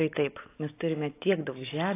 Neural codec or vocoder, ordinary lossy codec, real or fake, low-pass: none; AAC, 24 kbps; real; 3.6 kHz